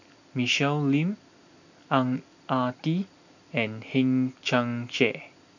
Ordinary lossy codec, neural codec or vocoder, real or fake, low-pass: none; none; real; 7.2 kHz